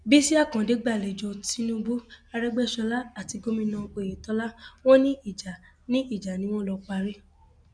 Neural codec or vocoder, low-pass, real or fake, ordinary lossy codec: none; 9.9 kHz; real; none